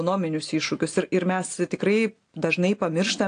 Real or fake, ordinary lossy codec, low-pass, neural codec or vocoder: real; AAC, 48 kbps; 9.9 kHz; none